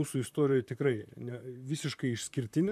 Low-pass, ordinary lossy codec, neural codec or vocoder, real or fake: 14.4 kHz; MP3, 96 kbps; vocoder, 44.1 kHz, 128 mel bands, Pupu-Vocoder; fake